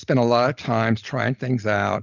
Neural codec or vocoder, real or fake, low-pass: none; real; 7.2 kHz